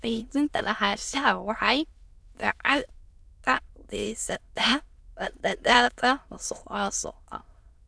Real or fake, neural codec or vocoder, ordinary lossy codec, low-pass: fake; autoencoder, 22.05 kHz, a latent of 192 numbers a frame, VITS, trained on many speakers; none; none